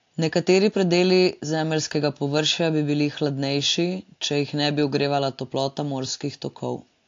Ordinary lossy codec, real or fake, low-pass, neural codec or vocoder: AAC, 48 kbps; real; 7.2 kHz; none